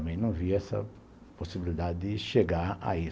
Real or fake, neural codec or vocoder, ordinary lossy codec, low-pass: real; none; none; none